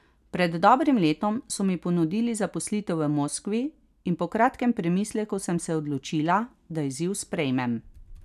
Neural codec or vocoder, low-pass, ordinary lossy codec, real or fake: none; 14.4 kHz; none; real